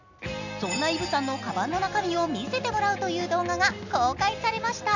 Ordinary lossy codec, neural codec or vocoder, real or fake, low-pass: none; none; real; 7.2 kHz